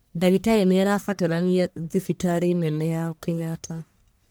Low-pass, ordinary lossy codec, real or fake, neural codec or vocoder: none; none; fake; codec, 44.1 kHz, 1.7 kbps, Pupu-Codec